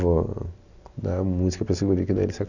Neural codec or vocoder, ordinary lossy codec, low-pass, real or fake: none; none; 7.2 kHz; real